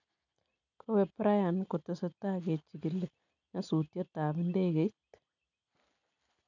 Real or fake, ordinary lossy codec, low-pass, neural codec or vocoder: real; none; 7.2 kHz; none